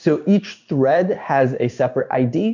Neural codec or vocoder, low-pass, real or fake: codec, 16 kHz in and 24 kHz out, 1 kbps, XY-Tokenizer; 7.2 kHz; fake